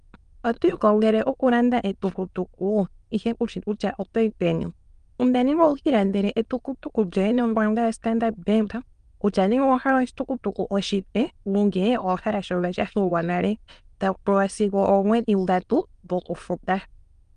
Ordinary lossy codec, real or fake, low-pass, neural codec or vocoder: Opus, 32 kbps; fake; 9.9 kHz; autoencoder, 22.05 kHz, a latent of 192 numbers a frame, VITS, trained on many speakers